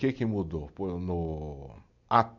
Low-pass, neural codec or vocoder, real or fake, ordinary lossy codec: 7.2 kHz; none; real; none